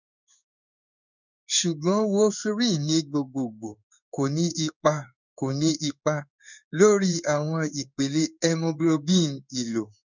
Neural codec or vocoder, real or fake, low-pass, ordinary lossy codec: codec, 16 kHz in and 24 kHz out, 1 kbps, XY-Tokenizer; fake; 7.2 kHz; none